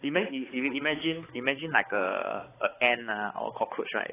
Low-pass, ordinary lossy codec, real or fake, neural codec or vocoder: 3.6 kHz; AAC, 16 kbps; fake; codec, 16 kHz, 4 kbps, X-Codec, HuBERT features, trained on balanced general audio